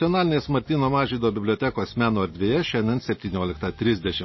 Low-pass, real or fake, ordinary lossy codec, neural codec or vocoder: 7.2 kHz; real; MP3, 24 kbps; none